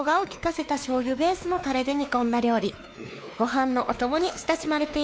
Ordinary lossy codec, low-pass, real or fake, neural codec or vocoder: none; none; fake; codec, 16 kHz, 2 kbps, X-Codec, WavLM features, trained on Multilingual LibriSpeech